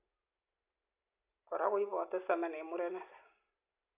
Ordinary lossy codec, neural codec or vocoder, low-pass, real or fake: AAC, 24 kbps; none; 3.6 kHz; real